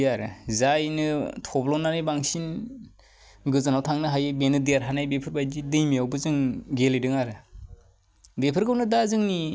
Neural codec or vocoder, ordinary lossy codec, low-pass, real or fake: none; none; none; real